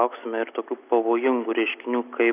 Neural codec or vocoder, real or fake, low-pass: none; real; 3.6 kHz